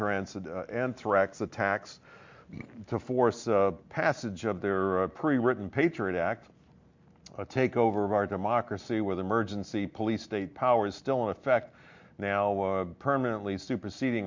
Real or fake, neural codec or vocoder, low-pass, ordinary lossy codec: real; none; 7.2 kHz; MP3, 48 kbps